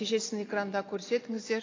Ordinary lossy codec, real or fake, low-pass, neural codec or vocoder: AAC, 32 kbps; real; 7.2 kHz; none